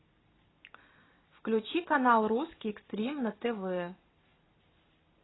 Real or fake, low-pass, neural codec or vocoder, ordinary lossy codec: real; 7.2 kHz; none; AAC, 16 kbps